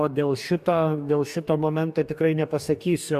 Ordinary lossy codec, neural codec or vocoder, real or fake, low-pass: AAC, 96 kbps; codec, 44.1 kHz, 2.6 kbps, DAC; fake; 14.4 kHz